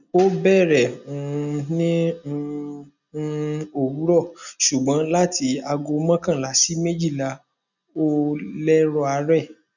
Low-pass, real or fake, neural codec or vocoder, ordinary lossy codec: 7.2 kHz; real; none; none